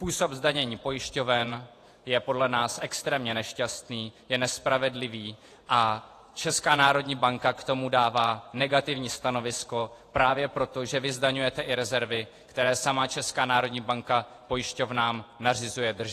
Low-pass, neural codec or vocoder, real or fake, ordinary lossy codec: 14.4 kHz; vocoder, 48 kHz, 128 mel bands, Vocos; fake; AAC, 48 kbps